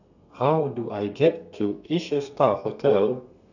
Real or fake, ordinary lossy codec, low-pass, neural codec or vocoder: fake; none; 7.2 kHz; codec, 32 kHz, 1.9 kbps, SNAC